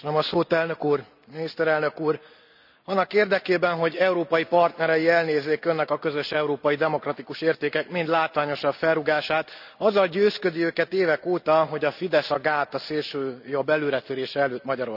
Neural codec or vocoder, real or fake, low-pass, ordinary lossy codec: none; real; 5.4 kHz; none